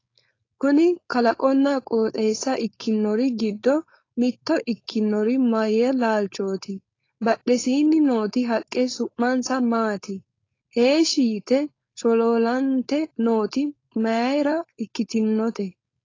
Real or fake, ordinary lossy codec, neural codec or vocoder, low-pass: fake; AAC, 32 kbps; codec, 16 kHz, 4.8 kbps, FACodec; 7.2 kHz